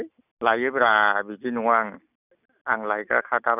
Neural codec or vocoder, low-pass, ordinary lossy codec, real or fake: none; 3.6 kHz; none; real